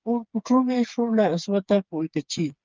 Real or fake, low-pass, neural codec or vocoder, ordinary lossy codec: fake; 7.2 kHz; codec, 16 kHz, 4 kbps, FreqCodec, smaller model; Opus, 24 kbps